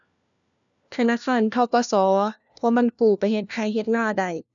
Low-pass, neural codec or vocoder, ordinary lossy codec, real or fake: 7.2 kHz; codec, 16 kHz, 1 kbps, FunCodec, trained on LibriTTS, 50 frames a second; none; fake